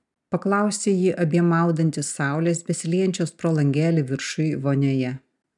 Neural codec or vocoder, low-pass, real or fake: none; 10.8 kHz; real